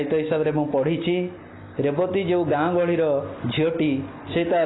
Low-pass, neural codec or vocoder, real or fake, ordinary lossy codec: 7.2 kHz; none; real; AAC, 16 kbps